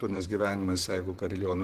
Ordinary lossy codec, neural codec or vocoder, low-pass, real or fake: Opus, 16 kbps; vocoder, 44.1 kHz, 128 mel bands, Pupu-Vocoder; 14.4 kHz; fake